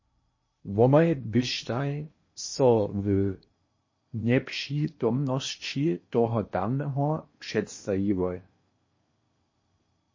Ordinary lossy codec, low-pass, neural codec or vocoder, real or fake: MP3, 32 kbps; 7.2 kHz; codec, 16 kHz in and 24 kHz out, 0.8 kbps, FocalCodec, streaming, 65536 codes; fake